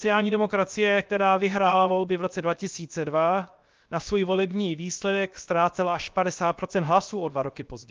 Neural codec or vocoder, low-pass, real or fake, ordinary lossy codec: codec, 16 kHz, 0.7 kbps, FocalCodec; 7.2 kHz; fake; Opus, 24 kbps